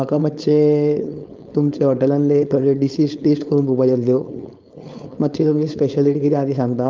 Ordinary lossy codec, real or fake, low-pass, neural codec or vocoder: Opus, 24 kbps; fake; 7.2 kHz; codec, 16 kHz, 4.8 kbps, FACodec